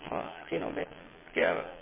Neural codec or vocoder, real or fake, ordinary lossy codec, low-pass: vocoder, 22.05 kHz, 80 mel bands, Vocos; fake; MP3, 16 kbps; 3.6 kHz